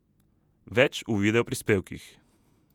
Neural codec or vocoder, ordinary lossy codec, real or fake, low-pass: vocoder, 48 kHz, 128 mel bands, Vocos; none; fake; 19.8 kHz